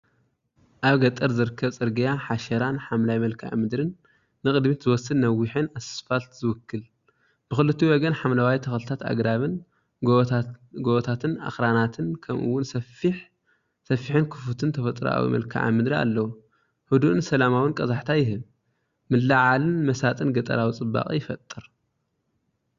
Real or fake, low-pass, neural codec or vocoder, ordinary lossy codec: real; 7.2 kHz; none; Opus, 64 kbps